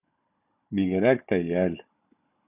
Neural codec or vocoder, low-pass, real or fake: codec, 16 kHz, 16 kbps, FunCodec, trained on Chinese and English, 50 frames a second; 3.6 kHz; fake